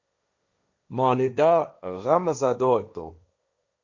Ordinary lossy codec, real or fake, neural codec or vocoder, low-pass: Opus, 64 kbps; fake; codec, 16 kHz, 1.1 kbps, Voila-Tokenizer; 7.2 kHz